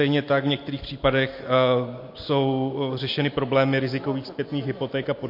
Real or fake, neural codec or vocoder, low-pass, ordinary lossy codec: real; none; 5.4 kHz; MP3, 32 kbps